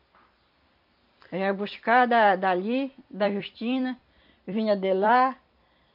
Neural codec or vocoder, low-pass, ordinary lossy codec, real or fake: vocoder, 44.1 kHz, 128 mel bands every 256 samples, BigVGAN v2; 5.4 kHz; MP3, 48 kbps; fake